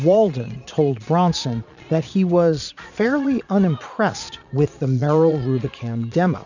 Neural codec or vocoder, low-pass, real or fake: vocoder, 22.05 kHz, 80 mel bands, Vocos; 7.2 kHz; fake